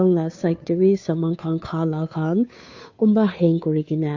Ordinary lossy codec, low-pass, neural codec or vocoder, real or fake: AAC, 48 kbps; 7.2 kHz; codec, 16 kHz, 2 kbps, FunCodec, trained on Chinese and English, 25 frames a second; fake